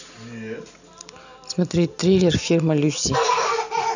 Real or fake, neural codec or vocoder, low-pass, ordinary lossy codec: real; none; 7.2 kHz; none